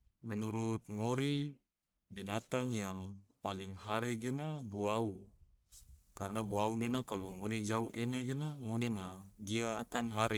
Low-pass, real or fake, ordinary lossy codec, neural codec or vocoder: none; fake; none; codec, 44.1 kHz, 1.7 kbps, Pupu-Codec